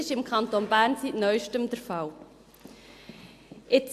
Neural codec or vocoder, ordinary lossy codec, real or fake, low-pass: none; AAC, 64 kbps; real; 14.4 kHz